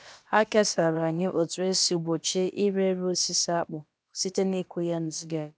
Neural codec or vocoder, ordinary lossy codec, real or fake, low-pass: codec, 16 kHz, about 1 kbps, DyCAST, with the encoder's durations; none; fake; none